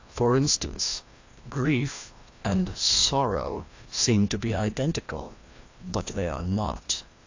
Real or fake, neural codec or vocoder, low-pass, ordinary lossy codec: fake; codec, 16 kHz, 1 kbps, FreqCodec, larger model; 7.2 kHz; AAC, 48 kbps